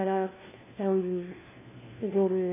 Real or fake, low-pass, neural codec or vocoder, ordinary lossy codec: fake; 3.6 kHz; codec, 16 kHz, 1 kbps, FunCodec, trained on LibriTTS, 50 frames a second; MP3, 16 kbps